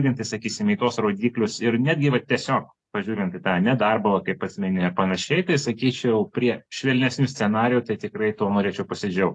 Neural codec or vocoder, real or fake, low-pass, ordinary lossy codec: none; real; 10.8 kHz; AAC, 48 kbps